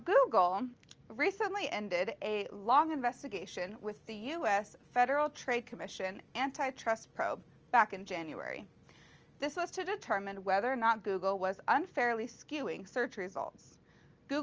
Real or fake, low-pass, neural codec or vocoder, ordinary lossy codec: real; 7.2 kHz; none; Opus, 24 kbps